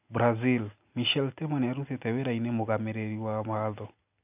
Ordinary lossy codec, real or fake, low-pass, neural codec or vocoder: none; real; 3.6 kHz; none